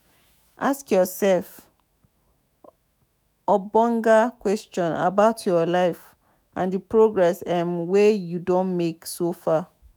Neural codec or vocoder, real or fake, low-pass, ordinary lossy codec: autoencoder, 48 kHz, 128 numbers a frame, DAC-VAE, trained on Japanese speech; fake; none; none